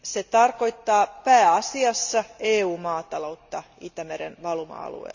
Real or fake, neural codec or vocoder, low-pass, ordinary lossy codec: real; none; 7.2 kHz; none